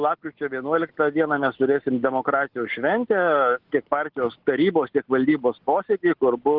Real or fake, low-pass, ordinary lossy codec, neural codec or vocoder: real; 5.4 kHz; Opus, 16 kbps; none